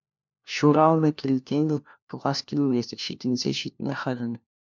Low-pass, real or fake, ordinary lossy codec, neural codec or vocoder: 7.2 kHz; fake; MP3, 64 kbps; codec, 16 kHz, 1 kbps, FunCodec, trained on LibriTTS, 50 frames a second